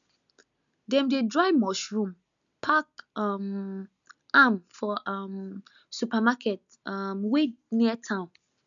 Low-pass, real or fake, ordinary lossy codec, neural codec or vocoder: 7.2 kHz; real; none; none